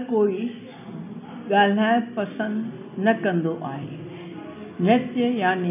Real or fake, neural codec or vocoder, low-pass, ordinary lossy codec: real; none; 3.6 kHz; none